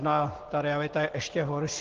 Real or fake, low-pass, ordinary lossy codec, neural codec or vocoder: real; 7.2 kHz; Opus, 16 kbps; none